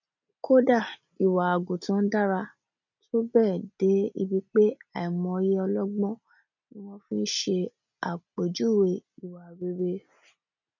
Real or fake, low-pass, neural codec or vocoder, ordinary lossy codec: real; 7.2 kHz; none; none